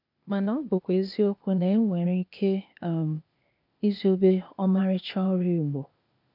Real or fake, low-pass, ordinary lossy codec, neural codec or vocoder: fake; 5.4 kHz; none; codec, 16 kHz, 0.8 kbps, ZipCodec